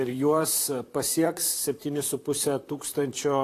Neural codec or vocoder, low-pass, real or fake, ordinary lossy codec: vocoder, 44.1 kHz, 128 mel bands, Pupu-Vocoder; 14.4 kHz; fake; AAC, 48 kbps